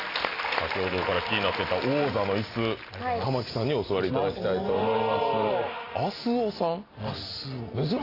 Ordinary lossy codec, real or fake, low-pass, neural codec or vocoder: AAC, 24 kbps; real; 5.4 kHz; none